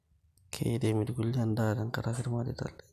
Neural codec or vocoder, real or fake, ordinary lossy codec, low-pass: none; real; none; 14.4 kHz